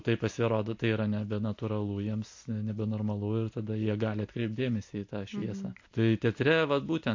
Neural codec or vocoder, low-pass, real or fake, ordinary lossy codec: none; 7.2 kHz; real; MP3, 48 kbps